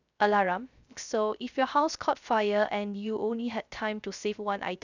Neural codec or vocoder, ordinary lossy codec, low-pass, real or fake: codec, 16 kHz, 0.3 kbps, FocalCodec; none; 7.2 kHz; fake